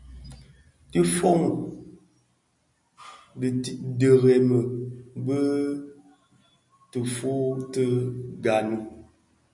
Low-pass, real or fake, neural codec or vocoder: 10.8 kHz; real; none